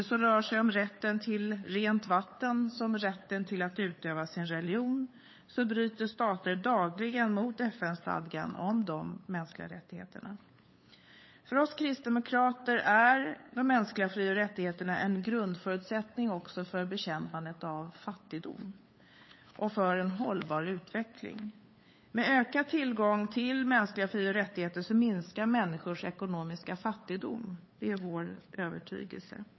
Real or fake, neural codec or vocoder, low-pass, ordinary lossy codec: fake; codec, 16 kHz, 8 kbps, FunCodec, trained on LibriTTS, 25 frames a second; 7.2 kHz; MP3, 24 kbps